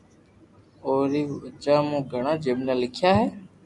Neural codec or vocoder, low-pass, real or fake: none; 10.8 kHz; real